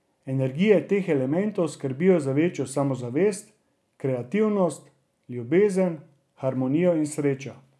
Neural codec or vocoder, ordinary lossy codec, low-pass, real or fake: none; none; none; real